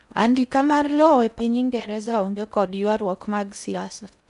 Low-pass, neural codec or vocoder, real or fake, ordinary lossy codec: 10.8 kHz; codec, 16 kHz in and 24 kHz out, 0.6 kbps, FocalCodec, streaming, 4096 codes; fake; none